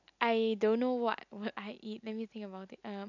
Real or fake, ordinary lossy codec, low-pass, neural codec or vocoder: real; none; 7.2 kHz; none